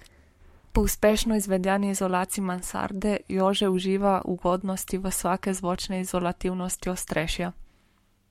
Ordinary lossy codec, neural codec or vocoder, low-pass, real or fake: MP3, 64 kbps; codec, 44.1 kHz, 7.8 kbps, Pupu-Codec; 19.8 kHz; fake